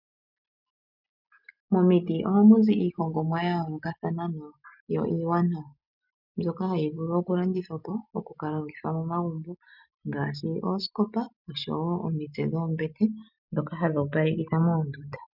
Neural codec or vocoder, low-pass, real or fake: none; 5.4 kHz; real